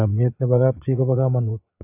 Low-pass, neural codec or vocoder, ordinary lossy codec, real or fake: 3.6 kHz; vocoder, 22.05 kHz, 80 mel bands, Vocos; AAC, 24 kbps; fake